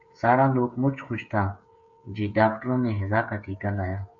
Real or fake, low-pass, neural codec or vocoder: fake; 7.2 kHz; codec, 16 kHz, 8 kbps, FreqCodec, smaller model